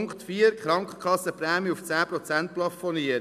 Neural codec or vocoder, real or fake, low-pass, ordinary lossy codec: none; real; 14.4 kHz; none